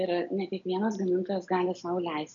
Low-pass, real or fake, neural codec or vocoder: 7.2 kHz; real; none